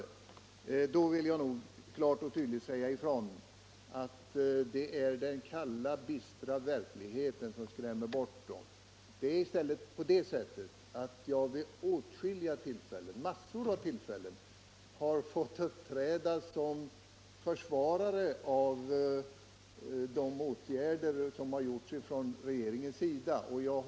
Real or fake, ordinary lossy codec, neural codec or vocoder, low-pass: real; none; none; none